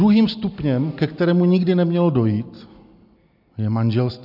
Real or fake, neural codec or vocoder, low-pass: real; none; 5.4 kHz